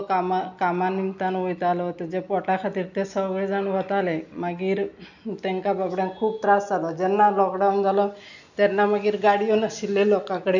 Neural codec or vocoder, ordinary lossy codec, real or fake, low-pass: none; none; real; 7.2 kHz